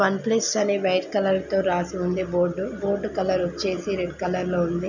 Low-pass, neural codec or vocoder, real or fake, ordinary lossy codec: 7.2 kHz; none; real; none